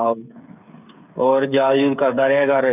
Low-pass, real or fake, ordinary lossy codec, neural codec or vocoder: 3.6 kHz; fake; none; codec, 16 kHz, 8 kbps, FreqCodec, smaller model